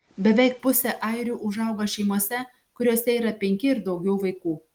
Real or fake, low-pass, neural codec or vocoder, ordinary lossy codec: real; 19.8 kHz; none; Opus, 24 kbps